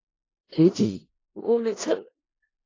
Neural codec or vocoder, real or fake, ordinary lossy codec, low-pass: codec, 16 kHz in and 24 kHz out, 0.4 kbps, LongCat-Audio-Codec, four codebook decoder; fake; AAC, 32 kbps; 7.2 kHz